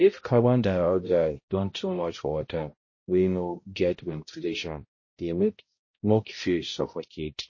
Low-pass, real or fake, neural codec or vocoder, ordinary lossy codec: 7.2 kHz; fake; codec, 16 kHz, 0.5 kbps, X-Codec, HuBERT features, trained on balanced general audio; MP3, 32 kbps